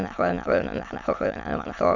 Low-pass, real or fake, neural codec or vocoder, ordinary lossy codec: 7.2 kHz; fake; autoencoder, 22.05 kHz, a latent of 192 numbers a frame, VITS, trained on many speakers; none